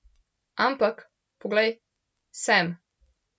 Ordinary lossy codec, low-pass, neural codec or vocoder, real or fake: none; none; none; real